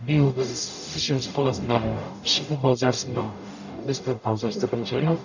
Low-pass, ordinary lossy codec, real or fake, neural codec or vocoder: 7.2 kHz; none; fake; codec, 44.1 kHz, 0.9 kbps, DAC